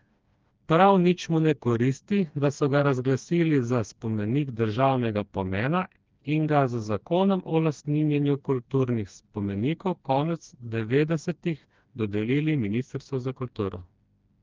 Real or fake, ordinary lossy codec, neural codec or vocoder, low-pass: fake; Opus, 24 kbps; codec, 16 kHz, 2 kbps, FreqCodec, smaller model; 7.2 kHz